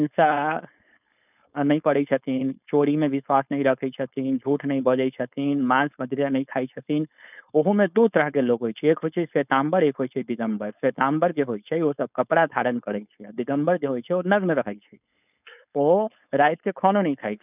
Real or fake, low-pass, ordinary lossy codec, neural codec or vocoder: fake; 3.6 kHz; none; codec, 16 kHz, 4.8 kbps, FACodec